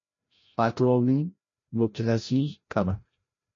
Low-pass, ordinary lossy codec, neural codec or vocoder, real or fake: 7.2 kHz; MP3, 32 kbps; codec, 16 kHz, 0.5 kbps, FreqCodec, larger model; fake